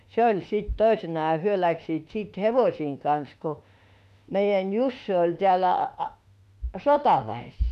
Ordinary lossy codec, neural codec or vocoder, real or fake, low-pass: none; autoencoder, 48 kHz, 32 numbers a frame, DAC-VAE, trained on Japanese speech; fake; 14.4 kHz